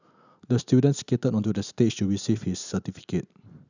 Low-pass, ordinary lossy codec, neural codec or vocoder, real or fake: 7.2 kHz; none; none; real